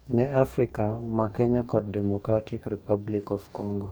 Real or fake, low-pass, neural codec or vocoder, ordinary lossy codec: fake; none; codec, 44.1 kHz, 2.6 kbps, DAC; none